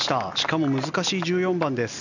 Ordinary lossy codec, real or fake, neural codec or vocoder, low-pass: none; real; none; 7.2 kHz